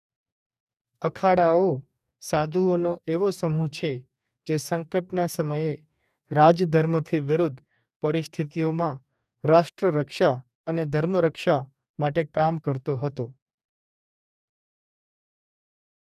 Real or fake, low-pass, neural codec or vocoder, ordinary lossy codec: fake; 14.4 kHz; codec, 44.1 kHz, 2.6 kbps, DAC; none